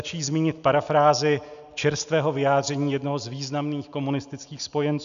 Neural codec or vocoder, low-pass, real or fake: none; 7.2 kHz; real